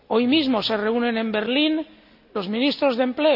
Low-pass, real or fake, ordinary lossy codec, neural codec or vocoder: 5.4 kHz; real; none; none